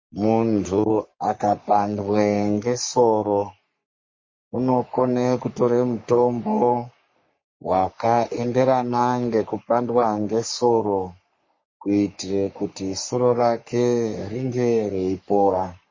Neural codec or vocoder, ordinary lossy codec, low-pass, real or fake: codec, 44.1 kHz, 3.4 kbps, Pupu-Codec; MP3, 32 kbps; 7.2 kHz; fake